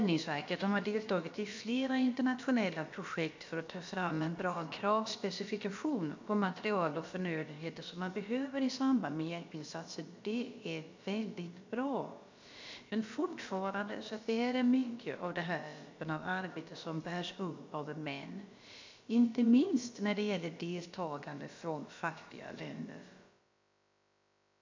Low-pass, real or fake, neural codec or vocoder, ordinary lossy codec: 7.2 kHz; fake; codec, 16 kHz, about 1 kbps, DyCAST, with the encoder's durations; MP3, 64 kbps